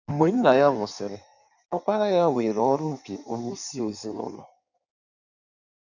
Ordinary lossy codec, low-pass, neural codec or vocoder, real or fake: none; 7.2 kHz; codec, 16 kHz in and 24 kHz out, 1.1 kbps, FireRedTTS-2 codec; fake